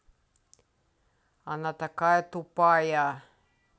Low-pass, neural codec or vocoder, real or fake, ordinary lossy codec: none; none; real; none